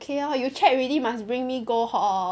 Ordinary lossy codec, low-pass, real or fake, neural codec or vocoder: none; none; real; none